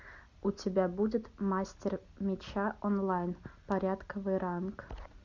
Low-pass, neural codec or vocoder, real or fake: 7.2 kHz; none; real